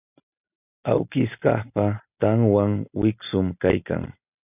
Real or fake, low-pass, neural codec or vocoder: real; 3.6 kHz; none